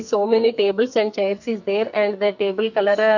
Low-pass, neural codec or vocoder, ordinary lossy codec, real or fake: 7.2 kHz; codec, 44.1 kHz, 2.6 kbps, SNAC; none; fake